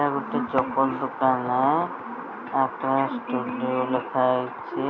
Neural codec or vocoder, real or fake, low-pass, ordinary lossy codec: none; real; 7.2 kHz; none